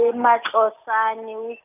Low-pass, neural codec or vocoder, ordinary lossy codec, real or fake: 3.6 kHz; none; none; real